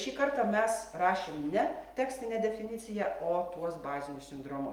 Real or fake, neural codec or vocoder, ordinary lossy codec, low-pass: real; none; Opus, 32 kbps; 19.8 kHz